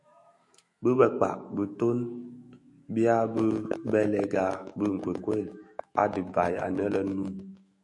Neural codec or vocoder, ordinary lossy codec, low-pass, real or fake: autoencoder, 48 kHz, 128 numbers a frame, DAC-VAE, trained on Japanese speech; MP3, 48 kbps; 10.8 kHz; fake